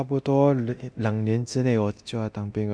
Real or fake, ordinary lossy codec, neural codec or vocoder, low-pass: fake; none; codec, 24 kHz, 0.9 kbps, DualCodec; 9.9 kHz